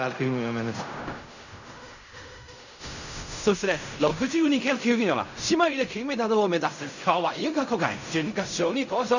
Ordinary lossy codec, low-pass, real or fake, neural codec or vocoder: none; 7.2 kHz; fake; codec, 16 kHz in and 24 kHz out, 0.4 kbps, LongCat-Audio-Codec, fine tuned four codebook decoder